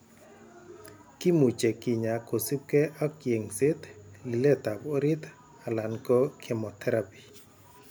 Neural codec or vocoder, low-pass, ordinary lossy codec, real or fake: none; none; none; real